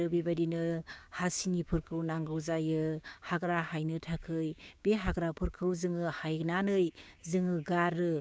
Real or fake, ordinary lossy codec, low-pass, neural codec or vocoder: fake; none; none; codec, 16 kHz, 6 kbps, DAC